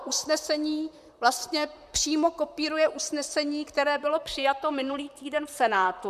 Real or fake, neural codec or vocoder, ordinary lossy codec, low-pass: fake; vocoder, 44.1 kHz, 128 mel bands, Pupu-Vocoder; MP3, 96 kbps; 14.4 kHz